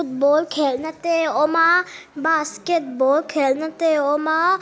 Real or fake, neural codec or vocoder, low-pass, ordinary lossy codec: real; none; none; none